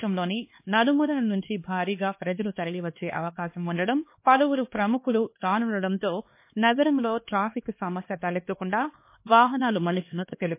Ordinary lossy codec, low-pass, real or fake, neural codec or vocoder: MP3, 24 kbps; 3.6 kHz; fake; codec, 16 kHz, 2 kbps, X-Codec, HuBERT features, trained on LibriSpeech